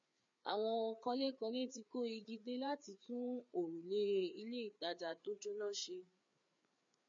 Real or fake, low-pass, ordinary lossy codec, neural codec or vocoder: fake; 7.2 kHz; MP3, 48 kbps; codec, 16 kHz, 4 kbps, FreqCodec, larger model